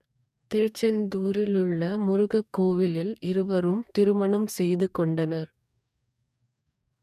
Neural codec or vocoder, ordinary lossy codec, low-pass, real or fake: codec, 44.1 kHz, 2.6 kbps, DAC; none; 14.4 kHz; fake